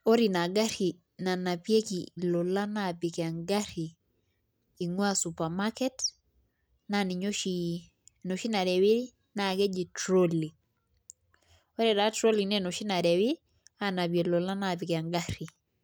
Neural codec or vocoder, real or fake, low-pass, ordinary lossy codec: none; real; none; none